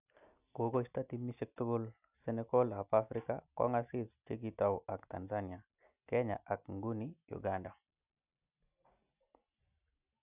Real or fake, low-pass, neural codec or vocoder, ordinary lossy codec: real; 3.6 kHz; none; none